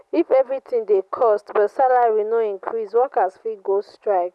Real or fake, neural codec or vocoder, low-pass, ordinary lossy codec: real; none; none; none